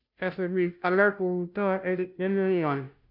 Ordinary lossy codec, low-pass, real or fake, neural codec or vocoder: none; 5.4 kHz; fake; codec, 16 kHz, 0.5 kbps, FunCodec, trained on Chinese and English, 25 frames a second